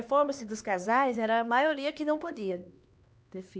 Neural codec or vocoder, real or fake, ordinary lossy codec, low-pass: codec, 16 kHz, 2 kbps, X-Codec, HuBERT features, trained on LibriSpeech; fake; none; none